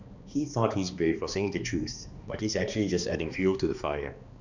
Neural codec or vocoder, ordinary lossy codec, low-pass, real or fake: codec, 16 kHz, 2 kbps, X-Codec, HuBERT features, trained on balanced general audio; none; 7.2 kHz; fake